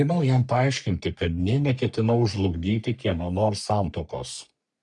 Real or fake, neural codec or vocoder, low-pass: fake; codec, 44.1 kHz, 3.4 kbps, Pupu-Codec; 10.8 kHz